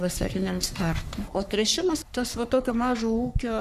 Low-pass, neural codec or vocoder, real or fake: 14.4 kHz; codec, 44.1 kHz, 3.4 kbps, Pupu-Codec; fake